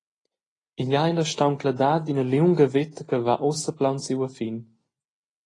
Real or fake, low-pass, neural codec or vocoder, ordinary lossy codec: real; 10.8 kHz; none; AAC, 32 kbps